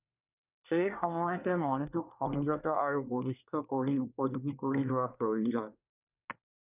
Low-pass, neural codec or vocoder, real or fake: 3.6 kHz; codec, 24 kHz, 1 kbps, SNAC; fake